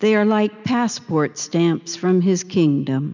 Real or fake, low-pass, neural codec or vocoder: real; 7.2 kHz; none